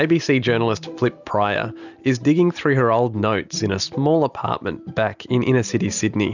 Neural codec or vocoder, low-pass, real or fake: none; 7.2 kHz; real